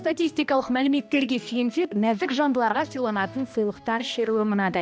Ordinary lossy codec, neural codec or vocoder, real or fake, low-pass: none; codec, 16 kHz, 1 kbps, X-Codec, HuBERT features, trained on balanced general audio; fake; none